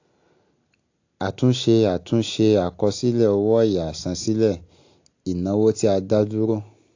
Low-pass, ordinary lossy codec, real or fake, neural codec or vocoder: 7.2 kHz; AAC, 48 kbps; real; none